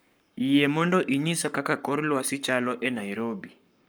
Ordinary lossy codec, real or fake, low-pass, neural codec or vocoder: none; fake; none; codec, 44.1 kHz, 7.8 kbps, Pupu-Codec